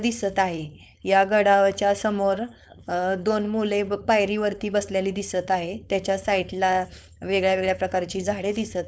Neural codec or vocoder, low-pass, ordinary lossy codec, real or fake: codec, 16 kHz, 4.8 kbps, FACodec; none; none; fake